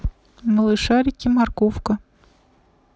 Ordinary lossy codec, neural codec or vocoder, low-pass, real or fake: none; none; none; real